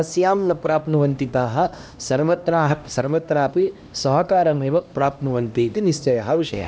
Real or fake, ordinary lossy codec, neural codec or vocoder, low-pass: fake; none; codec, 16 kHz, 1 kbps, X-Codec, HuBERT features, trained on LibriSpeech; none